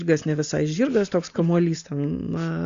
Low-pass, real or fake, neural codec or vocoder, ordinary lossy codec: 7.2 kHz; real; none; Opus, 64 kbps